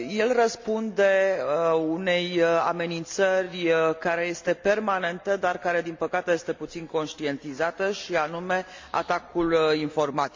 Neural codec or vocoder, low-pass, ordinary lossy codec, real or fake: none; 7.2 kHz; MP3, 64 kbps; real